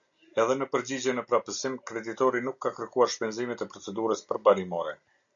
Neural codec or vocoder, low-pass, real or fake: none; 7.2 kHz; real